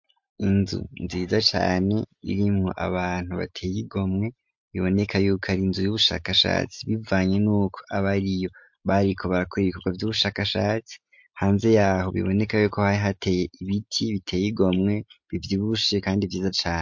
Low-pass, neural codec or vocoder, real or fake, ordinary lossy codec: 7.2 kHz; none; real; MP3, 48 kbps